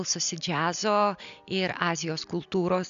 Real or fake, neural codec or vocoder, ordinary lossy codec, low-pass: real; none; AAC, 96 kbps; 7.2 kHz